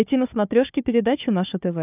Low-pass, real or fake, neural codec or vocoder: 3.6 kHz; fake; codec, 16 kHz, 4 kbps, FunCodec, trained on Chinese and English, 50 frames a second